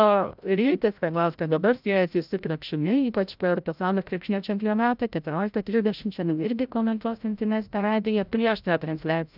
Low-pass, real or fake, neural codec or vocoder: 5.4 kHz; fake; codec, 16 kHz, 0.5 kbps, FreqCodec, larger model